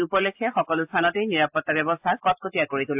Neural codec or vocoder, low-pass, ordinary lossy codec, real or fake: none; 3.6 kHz; AAC, 32 kbps; real